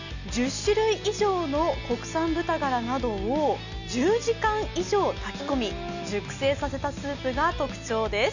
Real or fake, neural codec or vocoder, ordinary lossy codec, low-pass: real; none; none; 7.2 kHz